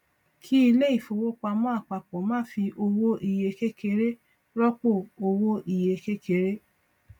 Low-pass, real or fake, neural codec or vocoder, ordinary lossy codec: 19.8 kHz; real; none; none